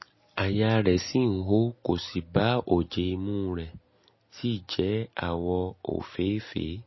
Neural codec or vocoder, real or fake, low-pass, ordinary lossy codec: none; real; 7.2 kHz; MP3, 24 kbps